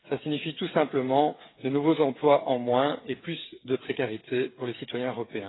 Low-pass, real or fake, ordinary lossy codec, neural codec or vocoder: 7.2 kHz; fake; AAC, 16 kbps; codec, 16 kHz, 8 kbps, FreqCodec, smaller model